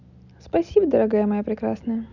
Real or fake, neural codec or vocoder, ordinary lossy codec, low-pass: real; none; none; 7.2 kHz